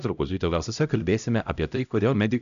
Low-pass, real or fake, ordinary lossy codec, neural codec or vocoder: 7.2 kHz; fake; MP3, 96 kbps; codec, 16 kHz, 0.5 kbps, X-Codec, HuBERT features, trained on LibriSpeech